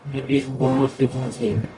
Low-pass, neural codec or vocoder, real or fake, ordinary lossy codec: 10.8 kHz; codec, 44.1 kHz, 0.9 kbps, DAC; fake; Opus, 64 kbps